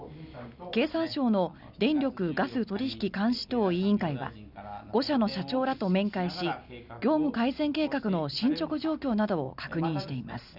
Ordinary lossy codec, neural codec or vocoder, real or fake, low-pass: none; none; real; 5.4 kHz